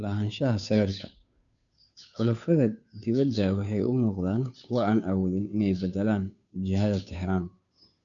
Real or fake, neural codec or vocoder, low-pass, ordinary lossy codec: fake; codec, 16 kHz, 2 kbps, FunCodec, trained on Chinese and English, 25 frames a second; 7.2 kHz; none